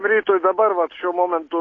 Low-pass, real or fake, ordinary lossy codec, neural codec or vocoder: 7.2 kHz; real; AAC, 32 kbps; none